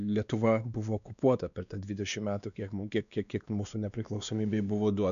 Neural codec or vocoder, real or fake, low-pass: codec, 16 kHz, 2 kbps, X-Codec, WavLM features, trained on Multilingual LibriSpeech; fake; 7.2 kHz